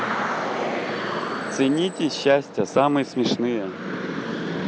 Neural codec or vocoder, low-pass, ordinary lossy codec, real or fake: none; none; none; real